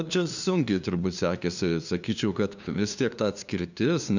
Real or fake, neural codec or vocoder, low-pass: fake; codec, 16 kHz, 2 kbps, FunCodec, trained on LibriTTS, 25 frames a second; 7.2 kHz